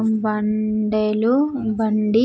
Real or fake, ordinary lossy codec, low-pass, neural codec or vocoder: real; none; none; none